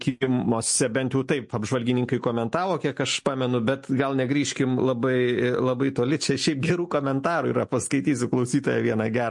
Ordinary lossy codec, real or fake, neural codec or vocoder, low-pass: MP3, 48 kbps; real; none; 10.8 kHz